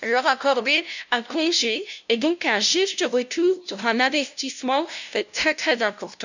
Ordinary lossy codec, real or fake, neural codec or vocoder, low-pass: none; fake; codec, 16 kHz, 0.5 kbps, FunCodec, trained on LibriTTS, 25 frames a second; 7.2 kHz